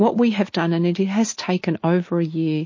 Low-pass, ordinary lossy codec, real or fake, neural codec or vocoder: 7.2 kHz; MP3, 32 kbps; real; none